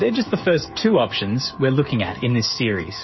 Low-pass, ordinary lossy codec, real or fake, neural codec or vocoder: 7.2 kHz; MP3, 24 kbps; fake; codec, 16 kHz, 8 kbps, FreqCodec, smaller model